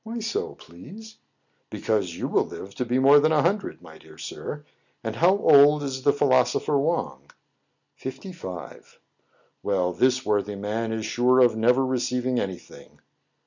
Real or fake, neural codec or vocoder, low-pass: real; none; 7.2 kHz